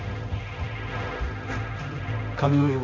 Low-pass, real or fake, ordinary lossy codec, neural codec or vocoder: 7.2 kHz; fake; MP3, 64 kbps; codec, 16 kHz, 1.1 kbps, Voila-Tokenizer